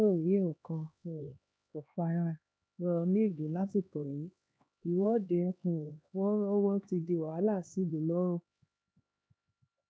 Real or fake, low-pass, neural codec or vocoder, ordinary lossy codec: fake; none; codec, 16 kHz, 2 kbps, X-Codec, HuBERT features, trained on LibriSpeech; none